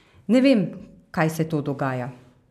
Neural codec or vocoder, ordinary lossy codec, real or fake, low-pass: none; none; real; 14.4 kHz